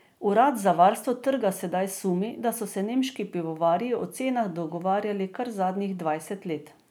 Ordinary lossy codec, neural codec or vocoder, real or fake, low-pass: none; none; real; none